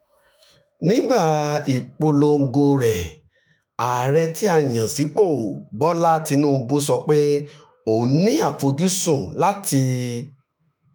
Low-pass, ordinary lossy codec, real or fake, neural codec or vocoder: none; none; fake; autoencoder, 48 kHz, 32 numbers a frame, DAC-VAE, trained on Japanese speech